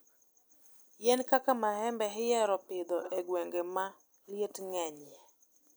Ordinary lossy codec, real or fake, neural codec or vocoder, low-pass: none; real; none; none